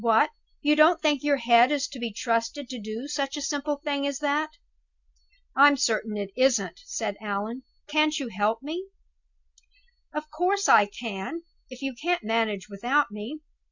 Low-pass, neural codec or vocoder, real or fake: 7.2 kHz; none; real